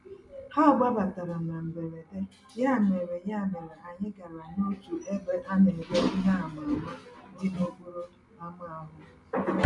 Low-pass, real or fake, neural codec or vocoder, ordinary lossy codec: 10.8 kHz; real; none; none